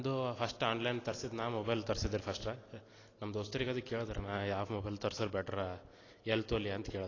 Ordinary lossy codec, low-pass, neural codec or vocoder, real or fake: AAC, 32 kbps; 7.2 kHz; none; real